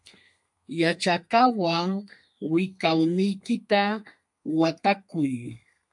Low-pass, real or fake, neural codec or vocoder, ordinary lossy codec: 10.8 kHz; fake; codec, 32 kHz, 1.9 kbps, SNAC; MP3, 64 kbps